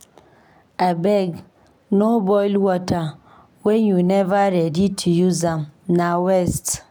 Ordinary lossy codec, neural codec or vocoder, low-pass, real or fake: none; none; none; real